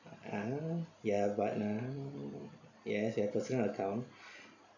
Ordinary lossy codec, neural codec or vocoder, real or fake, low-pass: none; none; real; 7.2 kHz